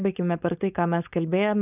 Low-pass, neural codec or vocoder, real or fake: 3.6 kHz; codec, 16 kHz, 4.8 kbps, FACodec; fake